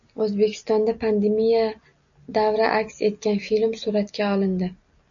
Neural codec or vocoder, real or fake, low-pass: none; real; 7.2 kHz